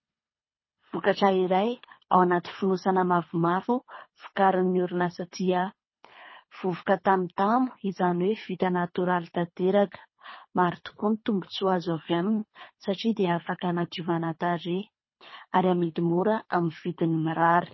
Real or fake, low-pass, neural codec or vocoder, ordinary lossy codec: fake; 7.2 kHz; codec, 24 kHz, 3 kbps, HILCodec; MP3, 24 kbps